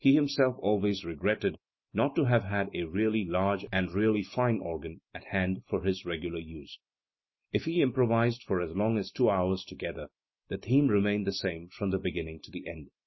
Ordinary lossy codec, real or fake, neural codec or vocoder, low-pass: MP3, 24 kbps; real; none; 7.2 kHz